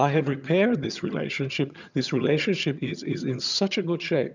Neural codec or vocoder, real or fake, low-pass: vocoder, 22.05 kHz, 80 mel bands, HiFi-GAN; fake; 7.2 kHz